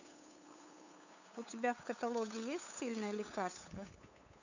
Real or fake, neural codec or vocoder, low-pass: fake; codec, 16 kHz, 8 kbps, FunCodec, trained on LibriTTS, 25 frames a second; 7.2 kHz